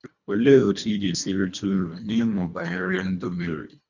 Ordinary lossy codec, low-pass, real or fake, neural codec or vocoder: none; 7.2 kHz; fake; codec, 24 kHz, 1.5 kbps, HILCodec